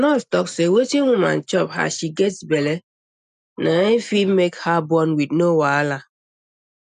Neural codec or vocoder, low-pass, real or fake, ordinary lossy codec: none; 9.9 kHz; real; none